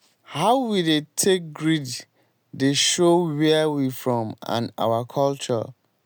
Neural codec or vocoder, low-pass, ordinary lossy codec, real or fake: none; none; none; real